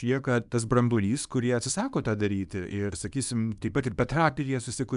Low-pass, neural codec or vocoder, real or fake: 10.8 kHz; codec, 24 kHz, 0.9 kbps, WavTokenizer, small release; fake